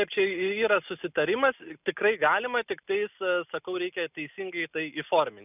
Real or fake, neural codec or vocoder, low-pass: real; none; 3.6 kHz